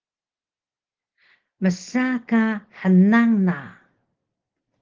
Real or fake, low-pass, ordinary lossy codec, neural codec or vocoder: real; 7.2 kHz; Opus, 16 kbps; none